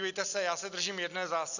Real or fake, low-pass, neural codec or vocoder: real; 7.2 kHz; none